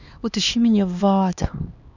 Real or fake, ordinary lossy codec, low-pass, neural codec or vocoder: fake; none; 7.2 kHz; codec, 16 kHz, 2 kbps, X-Codec, HuBERT features, trained on LibriSpeech